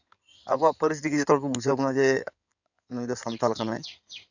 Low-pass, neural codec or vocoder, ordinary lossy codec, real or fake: 7.2 kHz; codec, 16 kHz in and 24 kHz out, 2.2 kbps, FireRedTTS-2 codec; none; fake